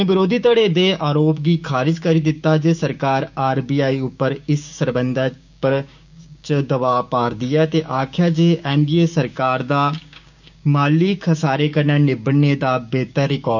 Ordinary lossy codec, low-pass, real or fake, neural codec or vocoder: none; 7.2 kHz; fake; codec, 16 kHz, 6 kbps, DAC